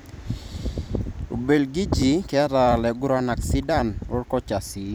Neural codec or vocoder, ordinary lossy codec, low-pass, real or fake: vocoder, 44.1 kHz, 128 mel bands every 256 samples, BigVGAN v2; none; none; fake